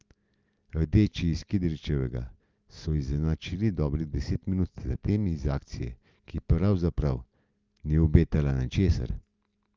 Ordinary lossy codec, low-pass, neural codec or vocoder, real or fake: Opus, 24 kbps; 7.2 kHz; none; real